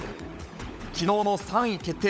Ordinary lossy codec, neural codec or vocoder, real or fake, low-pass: none; codec, 16 kHz, 16 kbps, FunCodec, trained on LibriTTS, 50 frames a second; fake; none